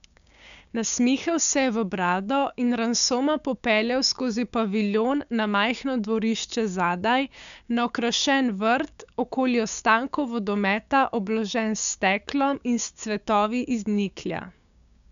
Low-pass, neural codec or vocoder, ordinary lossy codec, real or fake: 7.2 kHz; codec, 16 kHz, 6 kbps, DAC; none; fake